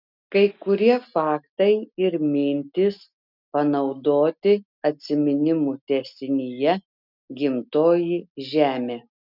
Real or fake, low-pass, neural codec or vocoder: real; 5.4 kHz; none